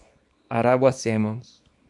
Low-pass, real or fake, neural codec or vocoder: 10.8 kHz; fake; codec, 24 kHz, 0.9 kbps, WavTokenizer, small release